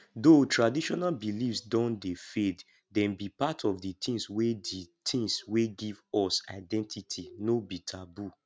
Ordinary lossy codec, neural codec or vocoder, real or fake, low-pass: none; none; real; none